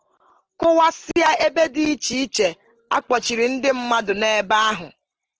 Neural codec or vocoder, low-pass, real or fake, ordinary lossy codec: none; 7.2 kHz; real; Opus, 16 kbps